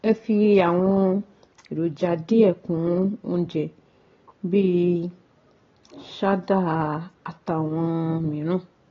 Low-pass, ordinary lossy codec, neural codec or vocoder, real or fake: 7.2 kHz; AAC, 32 kbps; none; real